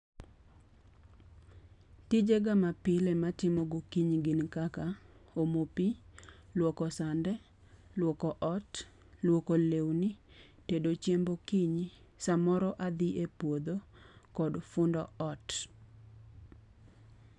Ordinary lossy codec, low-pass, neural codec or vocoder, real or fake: none; 10.8 kHz; none; real